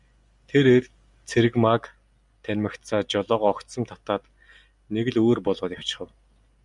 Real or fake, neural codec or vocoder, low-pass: real; none; 10.8 kHz